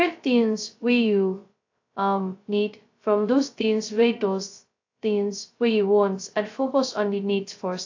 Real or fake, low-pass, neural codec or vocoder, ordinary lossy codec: fake; 7.2 kHz; codec, 16 kHz, 0.2 kbps, FocalCodec; AAC, 48 kbps